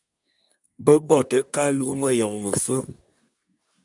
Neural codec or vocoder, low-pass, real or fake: codec, 24 kHz, 1 kbps, SNAC; 10.8 kHz; fake